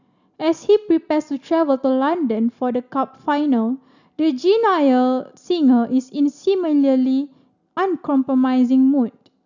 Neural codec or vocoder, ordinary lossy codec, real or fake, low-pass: none; none; real; 7.2 kHz